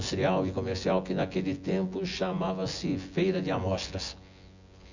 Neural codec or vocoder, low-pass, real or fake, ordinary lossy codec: vocoder, 24 kHz, 100 mel bands, Vocos; 7.2 kHz; fake; none